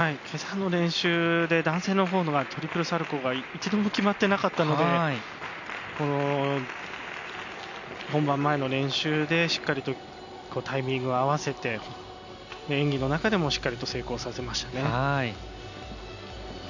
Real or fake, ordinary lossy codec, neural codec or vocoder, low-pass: real; none; none; 7.2 kHz